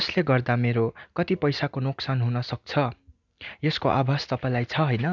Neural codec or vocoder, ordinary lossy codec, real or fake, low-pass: none; none; real; 7.2 kHz